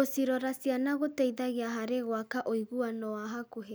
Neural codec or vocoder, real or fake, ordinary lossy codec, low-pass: none; real; none; none